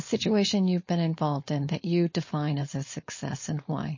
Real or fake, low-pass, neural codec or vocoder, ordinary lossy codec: fake; 7.2 kHz; codec, 16 kHz, 4.8 kbps, FACodec; MP3, 32 kbps